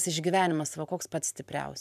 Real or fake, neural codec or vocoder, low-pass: fake; vocoder, 44.1 kHz, 128 mel bands every 512 samples, BigVGAN v2; 14.4 kHz